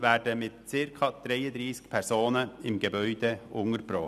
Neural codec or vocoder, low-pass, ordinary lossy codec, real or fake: none; 14.4 kHz; none; real